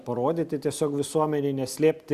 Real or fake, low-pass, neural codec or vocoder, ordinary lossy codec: real; 14.4 kHz; none; Opus, 64 kbps